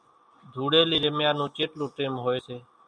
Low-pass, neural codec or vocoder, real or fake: 9.9 kHz; none; real